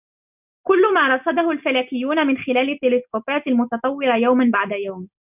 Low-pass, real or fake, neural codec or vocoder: 3.6 kHz; real; none